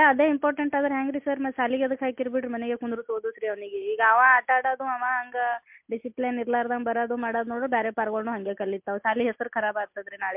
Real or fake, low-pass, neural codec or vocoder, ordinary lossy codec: real; 3.6 kHz; none; MP3, 32 kbps